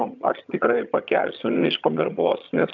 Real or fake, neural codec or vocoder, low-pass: fake; vocoder, 22.05 kHz, 80 mel bands, HiFi-GAN; 7.2 kHz